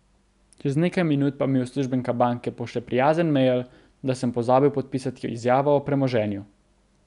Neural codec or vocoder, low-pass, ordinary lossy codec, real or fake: none; 10.8 kHz; none; real